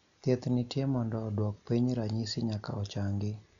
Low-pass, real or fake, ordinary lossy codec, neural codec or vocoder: 7.2 kHz; real; none; none